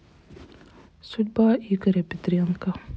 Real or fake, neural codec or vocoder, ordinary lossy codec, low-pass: real; none; none; none